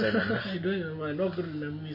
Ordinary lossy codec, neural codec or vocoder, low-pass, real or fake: MP3, 24 kbps; none; 5.4 kHz; real